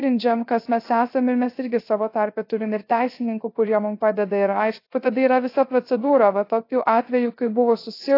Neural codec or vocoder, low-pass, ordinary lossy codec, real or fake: codec, 16 kHz, 0.3 kbps, FocalCodec; 5.4 kHz; AAC, 32 kbps; fake